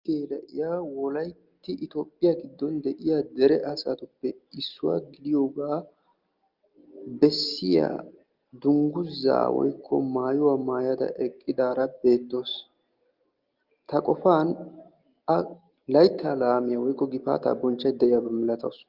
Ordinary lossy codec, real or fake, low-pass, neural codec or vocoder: Opus, 32 kbps; real; 5.4 kHz; none